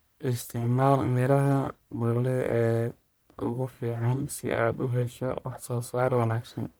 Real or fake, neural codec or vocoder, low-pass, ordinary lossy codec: fake; codec, 44.1 kHz, 1.7 kbps, Pupu-Codec; none; none